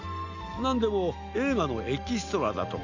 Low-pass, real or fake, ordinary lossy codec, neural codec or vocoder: 7.2 kHz; real; none; none